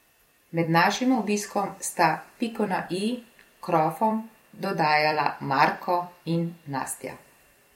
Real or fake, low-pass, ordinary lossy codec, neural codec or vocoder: fake; 19.8 kHz; MP3, 64 kbps; vocoder, 44.1 kHz, 128 mel bands every 256 samples, BigVGAN v2